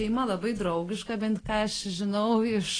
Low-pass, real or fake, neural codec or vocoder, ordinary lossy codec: 9.9 kHz; real; none; AAC, 32 kbps